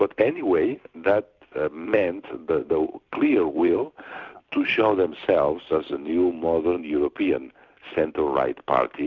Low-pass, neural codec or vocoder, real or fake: 7.2 kHz; vocoder, 44.1 kHz, 128 mel bands every 256 samples, BigVGAN v2; fake